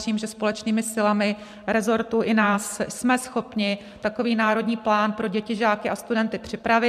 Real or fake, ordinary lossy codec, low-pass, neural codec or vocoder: fake; MP3, 96 kbps; 14.4 kHz; vocoder, 44.1 kHz, 128 mel bands every 512 samples, BigVGAN v2